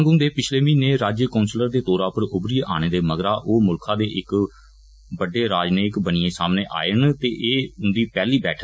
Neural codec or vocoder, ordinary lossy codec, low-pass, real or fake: none; none; none; real